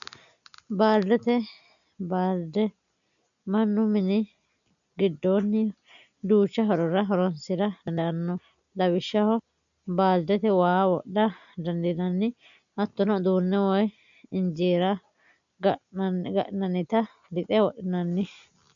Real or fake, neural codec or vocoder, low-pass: real; none; 7.2 kHz